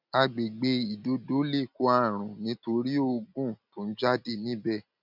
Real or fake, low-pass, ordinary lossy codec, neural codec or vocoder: real; 5.4 kHz; none; none